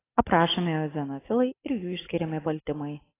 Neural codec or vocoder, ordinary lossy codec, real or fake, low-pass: none; AAC, 16 kbps; real; 3.6 kHz